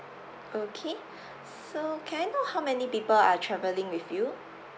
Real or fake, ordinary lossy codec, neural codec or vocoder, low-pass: real; none; none; none